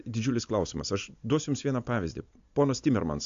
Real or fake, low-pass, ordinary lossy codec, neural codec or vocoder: real; 7.2 kHz; AAC, 64 kbps; none